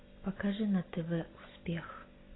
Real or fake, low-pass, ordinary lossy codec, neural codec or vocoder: real; 7.2 kHz; AAC, 16 kbps; none